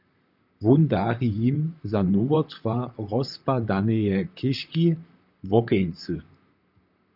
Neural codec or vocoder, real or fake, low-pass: vocoder, 44.1 kHz, 128 mel bands, Pupu-Vocoder; fake; 5.4 kHz